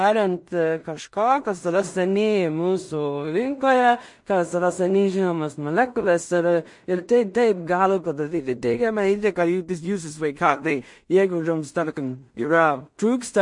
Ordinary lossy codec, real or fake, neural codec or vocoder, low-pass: MP3, 48 kbps; fake; codec, 16 kHz in and 24 kHz out, 0.4 kbps, LongCat-Audio-Codec, two codebook decoder; 10.8 kHz